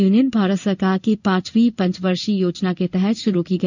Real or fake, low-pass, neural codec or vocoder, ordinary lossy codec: fake; 7.2 kHz; codec, 16 kHz in and 24 kHz out, 1 kbps, XY-Tokenizer; none